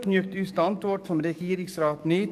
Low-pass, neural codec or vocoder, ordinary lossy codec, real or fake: 14.4 kHz; codec, 44.1 kHz, 7.8 kbps, DAC; none; fake